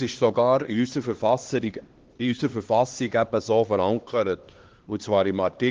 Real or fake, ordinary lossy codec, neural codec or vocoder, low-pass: fake; Opus, 16 kbps; codec, 16 kHz, 2 kbps, X-Codec, HuBERT features, trained on LibriSpeech; 7.2 kHz